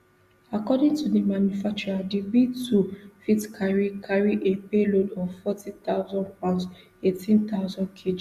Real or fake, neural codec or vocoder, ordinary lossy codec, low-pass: real; none; Opus, 64 kbps; 14.4 kHz